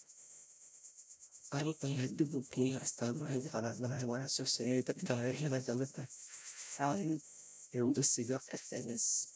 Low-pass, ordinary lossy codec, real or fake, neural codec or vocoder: none; none; fake; codec, 16 kHz, 0.5 kbps, FreqCodec, larger model